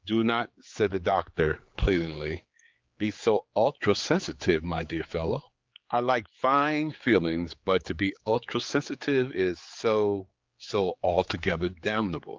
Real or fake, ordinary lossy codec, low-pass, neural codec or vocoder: fake; Opus, 24 kbps; 7.2 kHz; codec, 16 kHz, 4 kbps, X-Codec, HuBERT features, trained on general audio